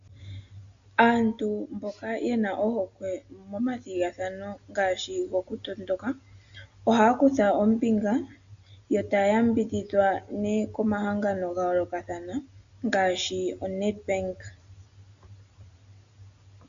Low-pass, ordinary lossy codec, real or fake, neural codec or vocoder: 7.2 kHz; AAC, 64 kbps; real; none